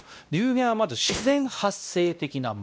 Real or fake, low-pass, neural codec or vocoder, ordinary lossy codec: fake; none; codec, 16 kHz, 1 kbps, X-Codec, WavLM features, trained on Multilingual LibriSpeech; none